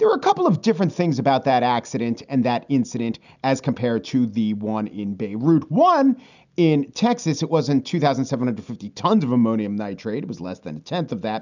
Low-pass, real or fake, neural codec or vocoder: 7.2 kHz; real; none